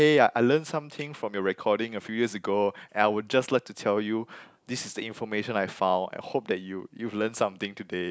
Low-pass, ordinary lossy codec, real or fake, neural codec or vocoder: none; none; real; none